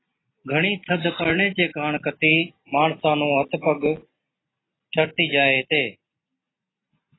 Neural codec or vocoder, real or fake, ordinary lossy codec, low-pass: none; real; AAC, 16 kbps; 7.2 kHz